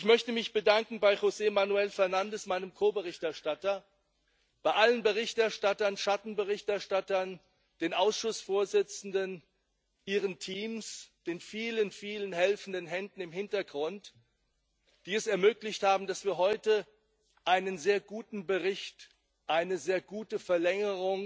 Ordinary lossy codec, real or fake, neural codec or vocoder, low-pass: none; real; none; none